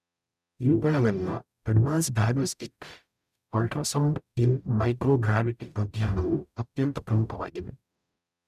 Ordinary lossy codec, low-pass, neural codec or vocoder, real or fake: none; 14.4 kHz; codec, 44.1 kHz, 0.9 kbps, DAC; fake